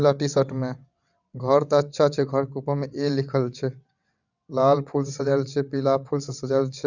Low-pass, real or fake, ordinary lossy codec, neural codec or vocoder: 7.2 kHz; fake; none; vocoder, 22.05 kHz, 80 mel bands, WaveNeXt